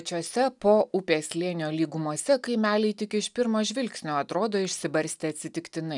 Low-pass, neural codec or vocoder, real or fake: 10.8 kHz; none; real